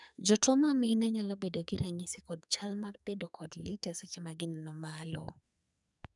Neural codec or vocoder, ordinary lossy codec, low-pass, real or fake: codec, 44.1 kHz, 2.6 kbps, SNAC; none; 10.8 kHz; fake